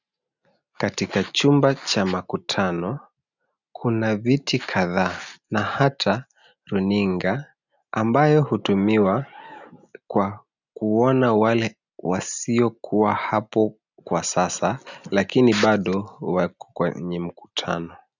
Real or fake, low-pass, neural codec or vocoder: real; 7.2 kHz; none